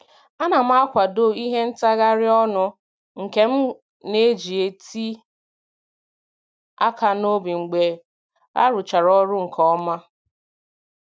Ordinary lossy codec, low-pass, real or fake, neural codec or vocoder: none; none; real; none